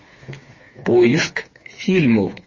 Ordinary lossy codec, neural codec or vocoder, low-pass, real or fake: MP3, 32 kbps; codec, 16 kHz, 4 kbps, FreqCodec, smaller model; 7.2 kHz; fake